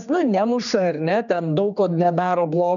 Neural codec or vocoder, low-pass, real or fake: codec, 16 kHz, 2 kbps, X-Codec, HuBERT features, trained on general audio; 7.2 kHz; fake